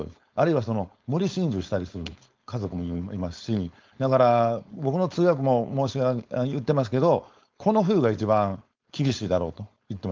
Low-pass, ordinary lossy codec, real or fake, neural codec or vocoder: 7.2 kHz; Opus, 32 kbps; fake; codec, 16 kHz, 4.8 kbps, FACodec